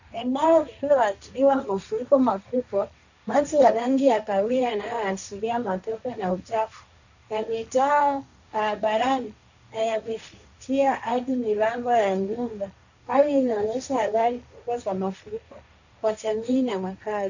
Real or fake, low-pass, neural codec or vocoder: fake; 7.2 kHz; codec, 16 kHz, 1.1 kbps, Voila-Tokenizer